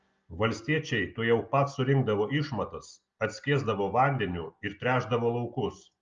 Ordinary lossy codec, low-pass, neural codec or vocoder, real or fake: Opus, 24 kbps; 7.2 kHz; none; real